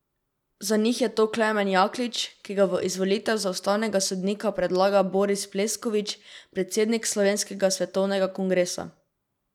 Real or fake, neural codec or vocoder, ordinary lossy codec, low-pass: real; none; none; 19.8 kHz